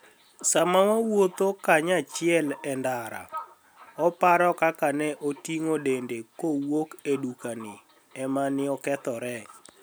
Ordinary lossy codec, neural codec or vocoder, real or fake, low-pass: none; none; real; none